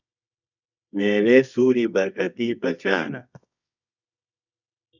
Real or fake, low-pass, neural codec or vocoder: fake; 7.2 kHz; codec, 24 kHz, 0.9 kbps, WavTokenizer, medium music audio release